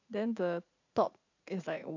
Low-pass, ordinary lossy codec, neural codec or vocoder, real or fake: 7.2 kHz; AAC, 48 kbps; vocoder, 44.1 kHz, 80 mel bands, Vocos; fake